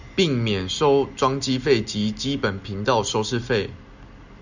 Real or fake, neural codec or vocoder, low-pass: real; none; 7.2 kHz